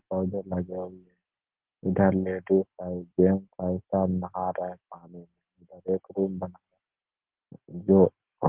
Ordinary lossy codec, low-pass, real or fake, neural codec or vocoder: Opus, 16 kbps; 3.6 kHz; real; none